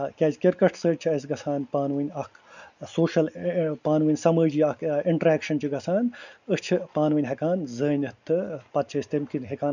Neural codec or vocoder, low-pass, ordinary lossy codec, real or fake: none; 7.2 kHz; none; real